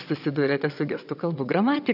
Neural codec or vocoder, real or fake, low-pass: vocoder, 22.05 kHz, 80 mel bands, WaveNeXt; fake; 5.4 kHz